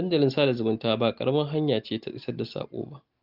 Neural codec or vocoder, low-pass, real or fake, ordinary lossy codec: none; 5.4 kHz; real; Opus, 32 kbps